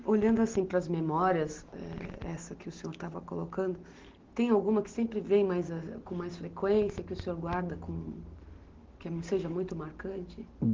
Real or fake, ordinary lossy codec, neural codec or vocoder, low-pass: real; Opus, 16 kbps; none; 7.2 kHz